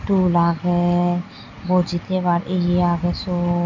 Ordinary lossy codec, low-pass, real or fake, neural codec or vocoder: none; 7.2 kHz; real; none